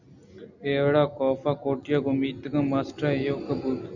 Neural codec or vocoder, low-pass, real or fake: none; 7.2 kHz; real